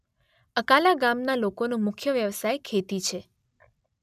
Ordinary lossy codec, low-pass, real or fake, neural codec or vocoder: none; 14.4 kHz; real; none